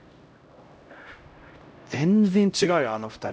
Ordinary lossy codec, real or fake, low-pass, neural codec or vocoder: none; fake; none; codec, 16 kHz, 0.5 kbps, X-Codec, HuBERT features, trained on LibriSpeech